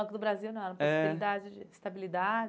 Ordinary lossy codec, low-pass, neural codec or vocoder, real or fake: none; none; none; real